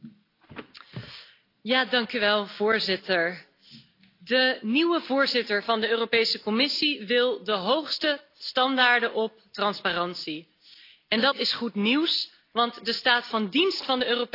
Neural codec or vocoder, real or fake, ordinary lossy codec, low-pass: none; real; AAC, 32 kbps; 5.4 kHz